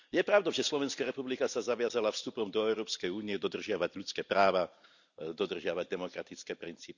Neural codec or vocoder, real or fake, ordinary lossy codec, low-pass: none; real; none; 7.2 kHz